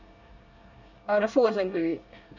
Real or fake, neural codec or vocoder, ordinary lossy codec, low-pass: fake; codec, 24 kHz, 1 kbps, SNAC; none; 7.2 kHz